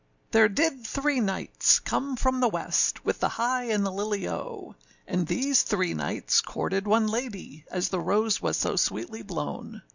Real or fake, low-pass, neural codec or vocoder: real; 7.2 kHz; none